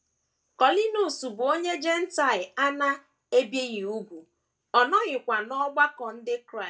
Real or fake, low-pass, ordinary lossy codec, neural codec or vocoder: real; none; none; none